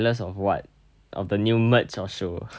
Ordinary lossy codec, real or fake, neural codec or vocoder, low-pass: none; real; none; none